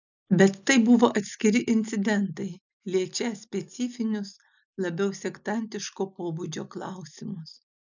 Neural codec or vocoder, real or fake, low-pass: none; real; 7.2 kHz